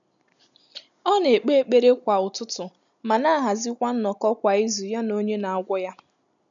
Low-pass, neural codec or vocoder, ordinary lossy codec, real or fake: 7.2 kHz; none; none; real